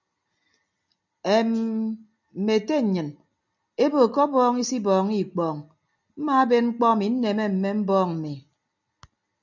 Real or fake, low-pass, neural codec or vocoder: real; 7.2 kHz; none